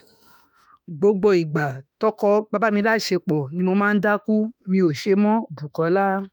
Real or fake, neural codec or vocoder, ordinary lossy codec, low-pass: fake; autoencoder, 48 kHz, 32 numbers a frame, DAC-VAE, trained on Japanese speech; none; 19.8 kHz